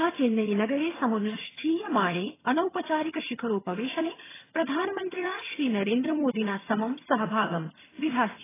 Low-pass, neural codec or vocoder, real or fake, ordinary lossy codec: 3.6 kHz; vocoder, 22.05 kHz, 80 mel bands, HiFi-GAN; fake; AAC, 16 kbps